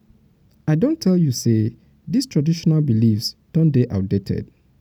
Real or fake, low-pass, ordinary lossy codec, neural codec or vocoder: real; 19.8 kHz; none; none